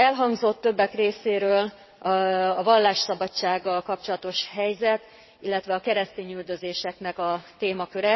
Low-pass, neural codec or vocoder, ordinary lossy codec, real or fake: 7.2 kHz; vocoder, 22.05 kHz, 80 mel bands, WaveNeXt; MP3, 24 kbps; fake